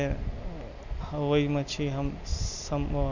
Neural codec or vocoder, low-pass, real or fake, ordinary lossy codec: none; 7.2 kHz; real; none